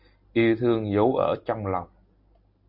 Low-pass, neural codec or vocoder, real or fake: 5.4 kHz; none; real